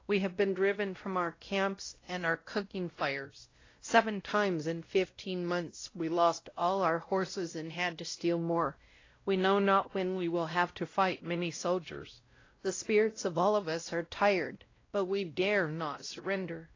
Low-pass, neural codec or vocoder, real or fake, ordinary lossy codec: 7.2 kHz; codec, 16 kHz, 0.5 kbps, X-Codec, WavLM features, trained on Multilingual LibriSpeech; fake; AAC, 32 kbps